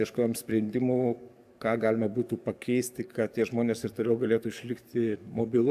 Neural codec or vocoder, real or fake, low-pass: codec, 44.1 kHz, 7.8 kbps, DAC; fake; 14.4 kHz